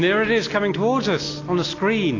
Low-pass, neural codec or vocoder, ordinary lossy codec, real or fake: 7.2 kHz; none; AAC, 32 kbps; real